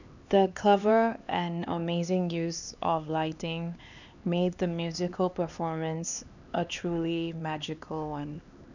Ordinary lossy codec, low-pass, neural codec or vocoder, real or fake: none; 7.2 kHz; codec, 16 kHz, 2 kbps, X-Codec, HuBERT features, trained on LibriSpeech; fake